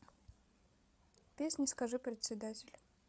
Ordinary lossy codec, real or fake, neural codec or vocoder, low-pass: none; fake; codec, 16 kHz, 8 kbps, FreqCodec, larger model; none